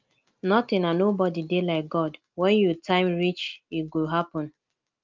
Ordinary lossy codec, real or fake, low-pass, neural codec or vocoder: Opus, 32 kbps; real; 7.2 kHz; none